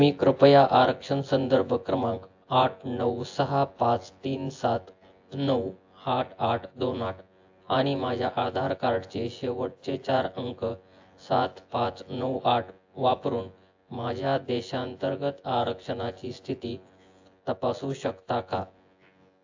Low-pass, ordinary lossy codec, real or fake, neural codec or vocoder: 7.2 kHz; AAC, 48 kbps; fake; vocoder, 24 kHz, 100 mel bands, Vocos